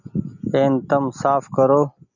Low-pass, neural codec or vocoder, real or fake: 7.2 kHz; none; real